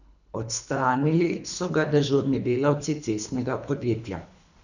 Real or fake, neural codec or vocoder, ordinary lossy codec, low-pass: fake; codec, 24 kHz, 3 kbps, HILCodec; none; 7.2 kHz